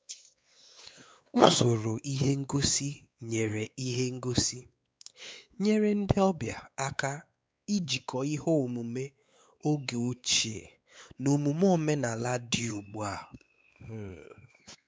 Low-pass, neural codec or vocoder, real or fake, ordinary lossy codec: none; codec, 16 kHz, 4 kbps, X-Codec, WavLM features, trained on Multilingual LibriSpeech; fake; none